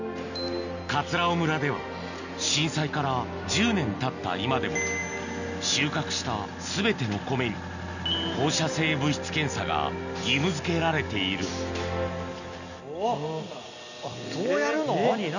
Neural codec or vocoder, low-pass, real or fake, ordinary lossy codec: none; 7.2 kHz; real; none